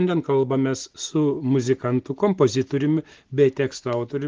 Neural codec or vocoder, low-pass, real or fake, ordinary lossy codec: none; 7.2 kHz; real; Opus, 16 kbps